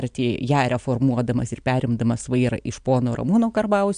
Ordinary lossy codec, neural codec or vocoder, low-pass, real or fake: MP3, 64 kbps; none; 9.9 kHz; real